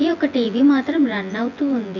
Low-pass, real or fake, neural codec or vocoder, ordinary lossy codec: 7.2 kHz; fake; vocoder, 24 kHz, 100 mel bands, Vocos; none